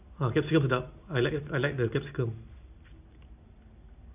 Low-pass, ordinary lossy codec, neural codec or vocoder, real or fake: 3.6 kHz; none; none; real